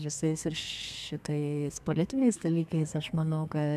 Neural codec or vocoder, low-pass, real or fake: codec, 32 kHz, 1.9 kbps, SNAC; 14.4 kHz; fake